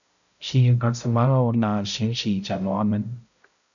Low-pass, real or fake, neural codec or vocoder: 7.2 kHz; fake; codec, 16 kHz, 0.5 kbps, X-Codec, HuBERT features, trained on balanced general audio